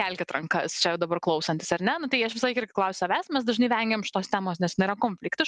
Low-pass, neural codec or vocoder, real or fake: 10.8 kHz; none; real